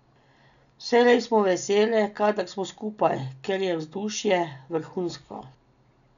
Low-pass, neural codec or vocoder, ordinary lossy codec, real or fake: 7.2 kHz; none; none; real